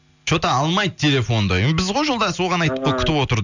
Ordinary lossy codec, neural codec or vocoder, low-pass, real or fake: none; none; 7.2 kHz; real